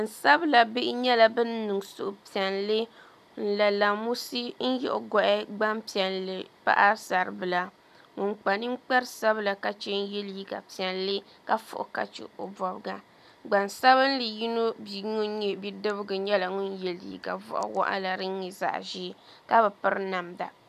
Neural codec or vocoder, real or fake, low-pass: none; real; 14.4 kHz